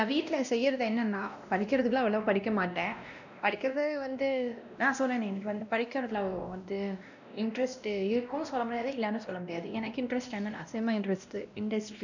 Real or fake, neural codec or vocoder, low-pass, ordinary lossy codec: fake; codec, 16 kHz, 1 kbps, X-Codec, WavLM features, trained on Multilingual LibriSpeech; 7.2 kHz; none